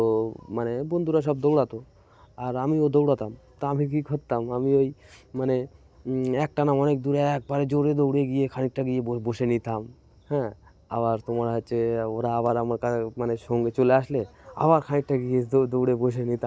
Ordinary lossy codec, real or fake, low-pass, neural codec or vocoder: none; real; none; none